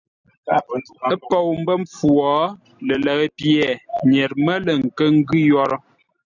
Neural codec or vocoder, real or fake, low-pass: none; real; 7.2 kHz